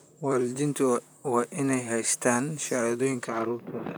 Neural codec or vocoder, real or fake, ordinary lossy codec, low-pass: vocoder, 44.1 kHz, 128 mel bands, Pupu-Vocoder; fake; none; none